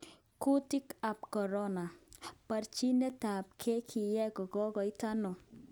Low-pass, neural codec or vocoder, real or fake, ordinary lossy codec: none; none; real; none